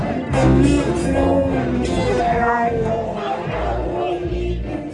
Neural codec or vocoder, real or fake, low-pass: codec, 44.1 kHz, 1.7 kbps, Pupu-Codec; fake; 10.8 kHz